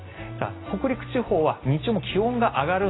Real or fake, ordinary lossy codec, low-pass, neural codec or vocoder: real; AAC, 16 kbps; 7.2 kHz; none